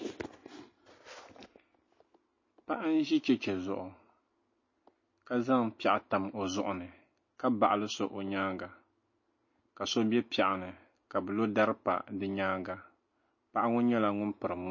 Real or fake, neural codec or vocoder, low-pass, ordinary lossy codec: real; none; 7.2 kHz; MP3, 32 kbps